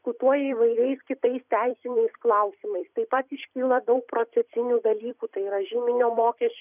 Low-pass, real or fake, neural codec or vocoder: 3.6 kHz; fake; vocoder, 44.1 kHz, 128 mel bands every 256 samples, BigVGAN v2